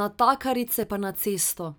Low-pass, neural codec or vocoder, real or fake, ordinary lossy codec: none; none; real; none